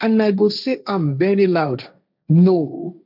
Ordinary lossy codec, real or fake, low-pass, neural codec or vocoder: none; fake; 5.4 kHz; codec, 16 kHz, 1.1 kbps, Voila-Tokenizer